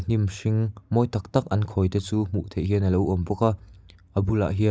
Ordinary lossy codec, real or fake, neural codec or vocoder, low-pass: none; real; none; none